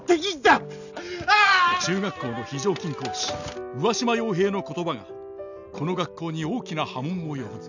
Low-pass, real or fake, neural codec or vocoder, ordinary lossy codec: 7.2 kHz; real; none; none